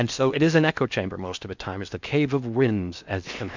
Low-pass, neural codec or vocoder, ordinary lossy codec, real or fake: 7.2 kHz; codec, 16 kHz in and 24 kHz out, 0.8 kbps, FocalCodec, streaming, 65536 codes; MP3, 64 kbps; fake